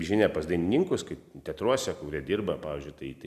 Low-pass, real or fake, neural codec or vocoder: 14.4 kHz; real; none